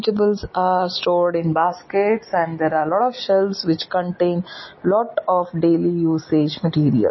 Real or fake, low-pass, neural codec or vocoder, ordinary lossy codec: real; 7.2 kHz; none; MP3, 24 kbps